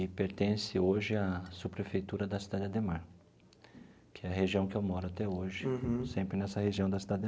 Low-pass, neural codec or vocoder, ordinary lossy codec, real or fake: none; none; none; real